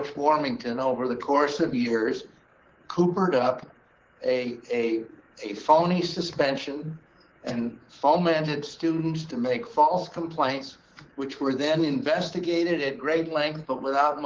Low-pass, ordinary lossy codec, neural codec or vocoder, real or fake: 7.2 kHz; Opus, 16 kbps; codec, 24 kHz, 3.1 kbps, DualCodec; fake